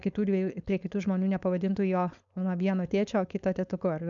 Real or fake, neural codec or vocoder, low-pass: fake; codec, 16 kHz, 4.8 kbps, FACodec; 7.2 kHz